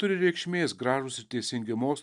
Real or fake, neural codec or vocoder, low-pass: real; none; 10.8 kHz